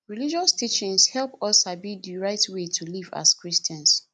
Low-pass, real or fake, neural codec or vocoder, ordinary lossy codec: 10.8 kHz; real; none; none